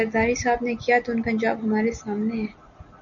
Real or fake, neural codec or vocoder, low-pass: real; none; 7.2 kHz